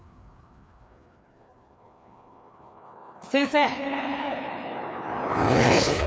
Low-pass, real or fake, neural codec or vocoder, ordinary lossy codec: none; fake; codec, 16 kHz, 2 kbps, FreqCodec, larger model; none